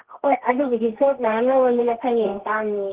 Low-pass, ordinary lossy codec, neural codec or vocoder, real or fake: 3.6 kHz; Opus, 16 kbps; codec, 24 kHz, 0.9 kbps, WavTokenizer, medium music audio release; fake